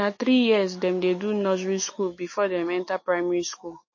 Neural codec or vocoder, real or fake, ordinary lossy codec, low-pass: autoencoder, 48 kHz, 128 numbers a frame, DAC-VAE, trained on Japanese speech; fake; MP3, 32 kbps; 7.2 kHz